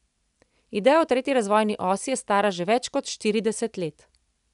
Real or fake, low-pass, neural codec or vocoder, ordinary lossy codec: real; 10.8 kHz; none; none